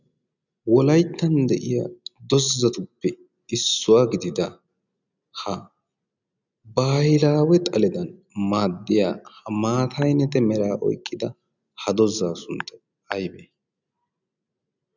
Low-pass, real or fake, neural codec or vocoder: 7.2 kHz; real; none